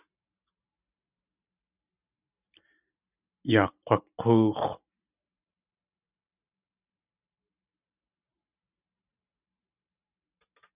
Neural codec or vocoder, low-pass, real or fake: vocoder, 44.1 kHz, 128 mel bands every 512 samples, BigVGAN v2; 3.6 kHz; fake